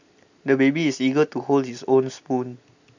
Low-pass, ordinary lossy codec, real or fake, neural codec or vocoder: 7.2 kHz; none; real; none